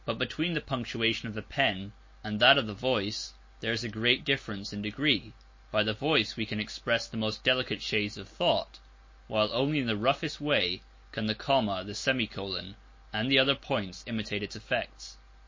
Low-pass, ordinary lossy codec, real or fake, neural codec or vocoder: 7.2 kHz; MP3, 32 kbps; real; none